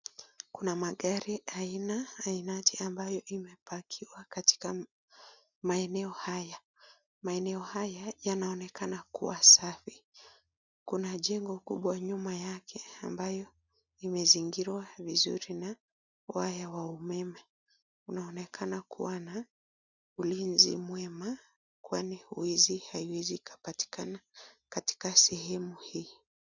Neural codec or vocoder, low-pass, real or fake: none; 7.2 kHz; real